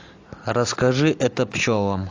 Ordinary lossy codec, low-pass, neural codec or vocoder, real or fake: AAC, 48 kbps; 7.2 kHz; none; real